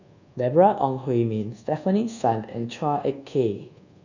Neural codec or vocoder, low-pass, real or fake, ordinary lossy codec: codec, 24 kHz, 1.2 kbps, DualCodec; 7.2 kHz; fake; none